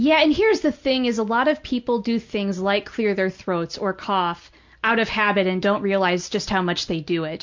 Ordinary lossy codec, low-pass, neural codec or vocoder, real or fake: MP3, 64 kbps; 7.2 kHz; none; real